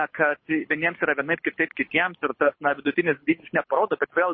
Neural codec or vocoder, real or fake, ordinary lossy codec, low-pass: codec, 24 kHz, 6 kbps, HILCodec; fake; MP3, 24 kbps; 7.2 kHz